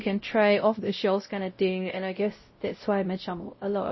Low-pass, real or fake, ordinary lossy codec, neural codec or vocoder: 7.2 kHz; fake; MP3, 24 kbps; codec, 16 kHz, 0.5 kbps, X-Codec, WavLM features, trained on Multilingual LibriSpeech